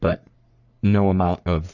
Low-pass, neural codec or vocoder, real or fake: 7.2 kHz; codec, 44.1 kHz, 3.4 kbps, Pupu-Codec; fake